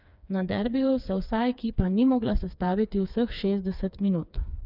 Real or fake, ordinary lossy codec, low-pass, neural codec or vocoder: fake; none; 5.4 kHz; codec, 16 kHz, 4 kbps, FreqCodec, smaller model